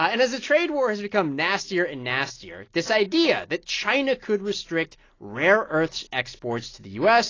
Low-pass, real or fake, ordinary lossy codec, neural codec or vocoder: 7.2 kHz; real; AAC, 32 kbps; none